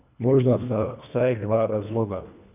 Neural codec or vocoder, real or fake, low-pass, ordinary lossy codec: codec, 24 kHz, 1.5 kbps, HILCodec; fake; 3.6 kHz; none